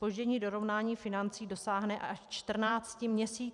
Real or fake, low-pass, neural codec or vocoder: fake; 10.8 kHz; vocoder, 44.1 kHz, 128 mel bands every 256 samples, BigVGAN v2